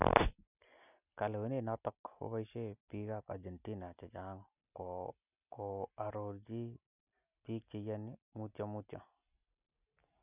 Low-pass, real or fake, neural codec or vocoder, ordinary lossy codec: 3.6 kHz; real; none; none